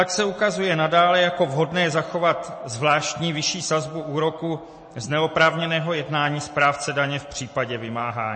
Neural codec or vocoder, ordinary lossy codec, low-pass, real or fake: none; MP3, 32 kbps; 9.9 kHz; real